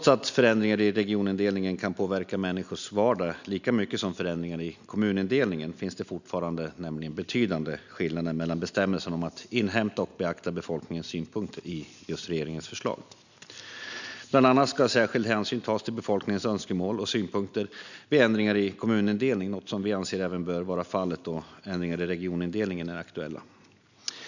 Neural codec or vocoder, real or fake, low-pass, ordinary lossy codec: none; real; 7.2 kHz; none